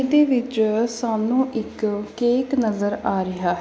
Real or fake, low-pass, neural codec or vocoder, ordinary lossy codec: real; none; none; none